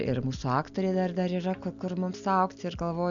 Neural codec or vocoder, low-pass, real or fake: none; 7.2 kHz; real